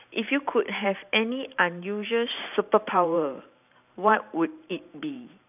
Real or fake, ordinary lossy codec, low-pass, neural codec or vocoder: fake; none; 3.6 kHz; vocoder, 44.1 kHz, 128 mel bands every 512 samples, BigVGAN v2